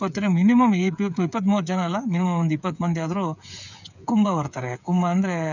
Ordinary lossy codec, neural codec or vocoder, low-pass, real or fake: none; codec, 16 kHz, 8 kbps, FreqCodec, smaller model; 7.2 kHz; fake